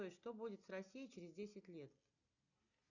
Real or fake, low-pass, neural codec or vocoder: real; 7.2 kHz; none